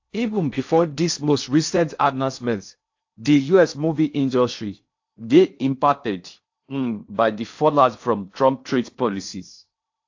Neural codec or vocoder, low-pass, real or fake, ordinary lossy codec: codec, 16 kHz in and 24 kHz out, 0.6 kbps, FocalCodec, streaming, 2048 codes; 7.2 kHz; fake; AAC, 48 kbps